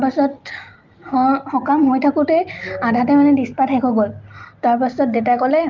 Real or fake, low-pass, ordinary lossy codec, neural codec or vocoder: real; 7.2 kHz; Opus, 32 kbps; none